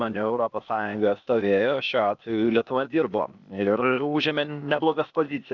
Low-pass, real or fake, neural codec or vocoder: 7.2 kHz; fake; codec, 16 kHz, 0.8 kbps, ZipCodec